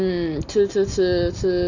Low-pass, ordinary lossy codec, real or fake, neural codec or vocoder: 7.2 kHz; none; real; none